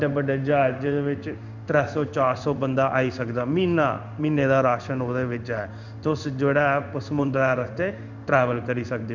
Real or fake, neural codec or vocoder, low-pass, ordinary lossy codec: fake; codec, 16 kHz in and 24 kHz out, 1 kbps, XY-Tokenizer; 7.2 kHz; none